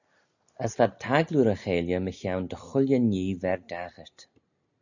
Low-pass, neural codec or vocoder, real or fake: 7.2 kHz; none; real